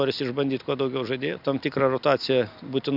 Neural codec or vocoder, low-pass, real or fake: none; 5.4 kHz; real